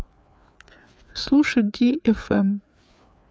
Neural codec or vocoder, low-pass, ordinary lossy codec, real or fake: codec, 16 kHz, 4 kbps, FreqCodec, larger model; none; none; fake